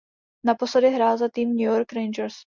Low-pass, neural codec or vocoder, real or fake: 7.2 kHz; none; real